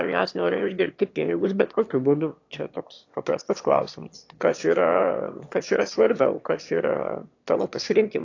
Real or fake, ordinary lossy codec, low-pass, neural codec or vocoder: fake; AAC, 48 kbps; 7.2 kHz; autoencoder, 22.05 kHz, a latent of 192 numbers a frame, VITS, trained on one speaker